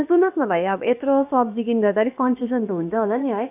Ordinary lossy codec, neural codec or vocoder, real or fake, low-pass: none; codec, 16 kHz, about 1 kbps, DyCAST, with the encoder's durations; fake; 3.6 kHz